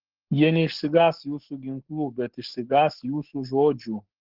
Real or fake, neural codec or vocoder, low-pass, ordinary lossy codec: fake; codec, 44.1 kHz, 7.8 kbps, Pupu-Codec; 5.4 kHz; Opus, 16 kbps